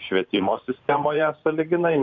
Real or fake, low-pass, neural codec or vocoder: fake; 7.2 kHz; vocoder, 44.1 kHz, 128 mel bands every 512 samples, BigVGAN v2